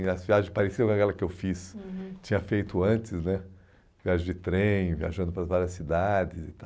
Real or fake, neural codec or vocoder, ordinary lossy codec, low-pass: real; none; none; none